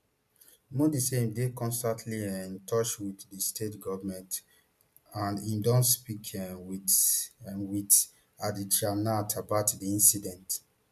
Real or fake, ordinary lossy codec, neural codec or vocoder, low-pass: real; none; none; 14.4 kHz